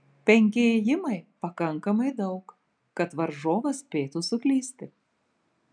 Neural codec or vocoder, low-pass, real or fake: none; 9.9 kHz; real